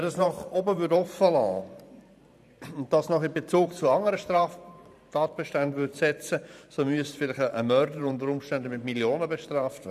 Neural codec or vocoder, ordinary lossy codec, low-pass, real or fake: vocoder, 44.1 kHz, 128 mel bands every 512 samples, BigVGAN v2; none; 14.4 kHz; fake